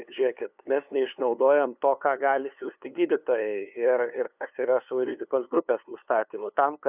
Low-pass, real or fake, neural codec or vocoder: 3.6 kHz; fake; codec, 16 kHz, 2 kbps, FunCodec, trained on LibriTTS, 25 frames a second